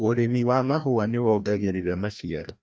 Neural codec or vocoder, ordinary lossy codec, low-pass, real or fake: codec, 16 kHz, 1 kbps, FreqCodec, larger model; none; none; fake